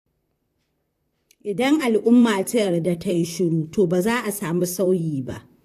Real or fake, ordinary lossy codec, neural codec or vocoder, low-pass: fake; AAC, 64 kbps; vocoder, 44.1 kHz, 128 mel bands, Pupu-Vocoder; 14.4 kHz